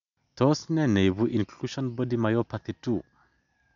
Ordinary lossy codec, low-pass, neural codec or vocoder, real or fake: none; 7.2 kHz; none; real